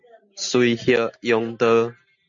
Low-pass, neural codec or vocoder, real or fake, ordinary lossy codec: 7.2 kHz; none; real; MP3, 64 kbps